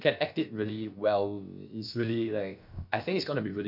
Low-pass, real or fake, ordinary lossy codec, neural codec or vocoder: 5.4 kHz; fake; none; codec, 16 kHz, about 1 kbps, DyCAST, with the encoder's durations